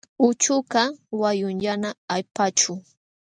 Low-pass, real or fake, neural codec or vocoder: 9.9 kHz; real; none